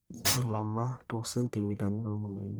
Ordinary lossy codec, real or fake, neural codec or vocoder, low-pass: none; fake; codec, 44.1 kHz, 1.7 kbps, Pupu-Codec; none